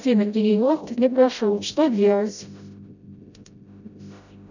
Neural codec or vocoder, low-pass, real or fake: codec, 16 kHz, 0.5 kbps, FreqCodec, smaller model; 7.2 kHz; fake